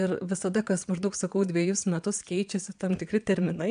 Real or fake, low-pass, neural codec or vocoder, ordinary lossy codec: fake; 9.9 kHz; vocoder, 22.05 kHz, 80 mel bands, Vocos; AAC, 96 kbps